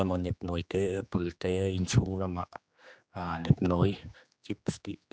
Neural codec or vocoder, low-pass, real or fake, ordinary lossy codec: codec, 16 kHz, 2 kbps, X-Codec, HuBERT features, trained on general audio; none; fake; none